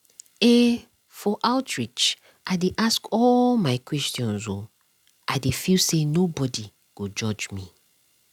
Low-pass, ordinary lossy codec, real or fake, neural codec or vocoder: 19.8 kHz; none; real; none